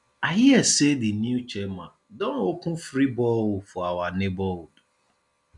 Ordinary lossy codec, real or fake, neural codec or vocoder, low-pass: none; real; none; 10.8 kHz